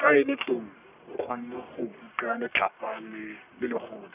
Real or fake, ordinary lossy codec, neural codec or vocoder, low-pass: fake; none; codec, 44.1 kHz, 1.7 kbps, Pupu-Codec; 3.6 kHz